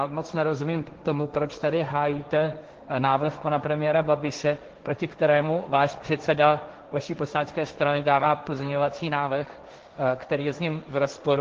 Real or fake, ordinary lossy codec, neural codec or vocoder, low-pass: fake; Opus, 16 kbps; codec, 16 kHz, 1.1 kbps, Voila-Tokenizer; 7.2 kHz